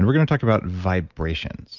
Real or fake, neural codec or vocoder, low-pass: real; none; 7.2 kHz